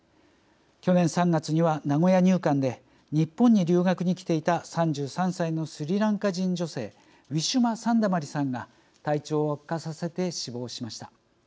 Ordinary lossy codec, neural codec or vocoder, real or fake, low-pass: none; none; real; none